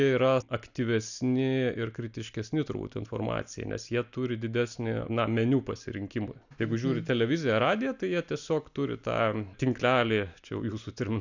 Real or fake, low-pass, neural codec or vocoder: real; 7.2 kHz; none